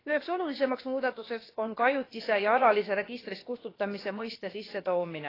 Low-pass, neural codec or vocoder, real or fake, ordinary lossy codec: 5.4 kHz; codec, 16 kHz, about 1 kbps, DyCAST, with the encoder's durations; fake; AAC, 24 kbps